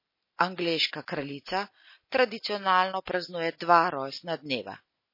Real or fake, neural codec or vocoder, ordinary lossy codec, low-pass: real; none; MP3, 24 kbps; 5.4 kHz